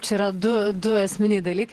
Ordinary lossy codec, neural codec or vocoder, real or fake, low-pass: Opus, 16 kbps; vocoder, 48 kHz, 128 mel bands, Vocos; fake; 14.4 kHz